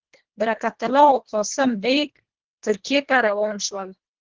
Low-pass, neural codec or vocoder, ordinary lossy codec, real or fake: 7.2 kHz; codec, 24 kHz, 1.5 kbps, HILCodec; Opus, 16 kbps; fake